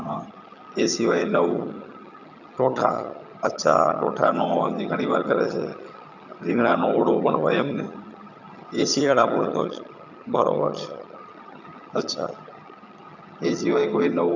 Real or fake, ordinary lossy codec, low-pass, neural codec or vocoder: fake; none; 7.2 kHz; vocoder, 22.05 kHz, 80 mel bands, HiFi-GAN